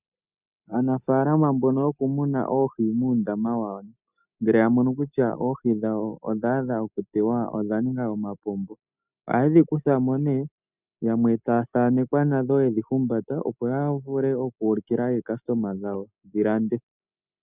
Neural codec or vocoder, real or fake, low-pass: none; real; 3.6 kHz